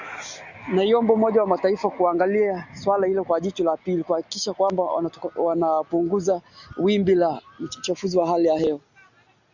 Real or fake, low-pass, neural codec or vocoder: real; 7.2 kHz; none